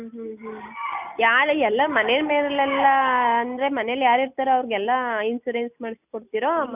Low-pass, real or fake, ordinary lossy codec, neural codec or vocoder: 3.6 kHz; real; none; none